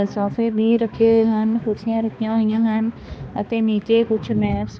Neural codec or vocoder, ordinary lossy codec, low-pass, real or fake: codec, 16 kHz, 2 kbps, X-Codec, HuBERT features, trained on balanced general audio; none; none; fake